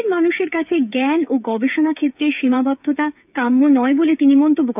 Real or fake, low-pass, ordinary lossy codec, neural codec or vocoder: fake; 3.6 kHz; none; codec, 16 kHz, 8 kbps, FreqCodec, smaller model